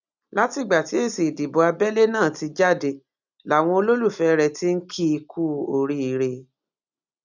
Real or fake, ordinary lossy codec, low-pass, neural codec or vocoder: real; none; 7.2 kHz; none